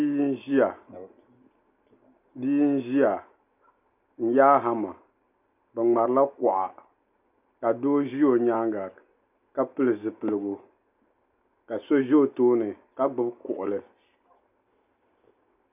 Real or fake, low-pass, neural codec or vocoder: real; 3.6 kHz; none